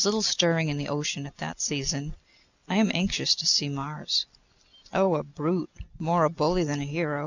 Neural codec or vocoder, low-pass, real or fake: none; 7.2 kHz; real